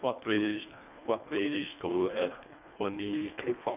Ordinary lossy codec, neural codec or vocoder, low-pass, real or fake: none; codec, 24 kHz, 1.5 kbps, HILCodec; 3.6 kHz; fake